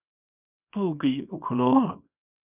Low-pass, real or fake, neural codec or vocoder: 3.6 kHz; fake; codec, 24 kHz, 0.9 kbps, WavTokenizer, small release